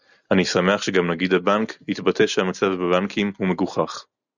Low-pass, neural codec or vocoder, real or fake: 7.2 kHz; none; real